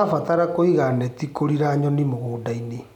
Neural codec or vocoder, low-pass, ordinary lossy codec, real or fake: none; 19.8 kHz; none; real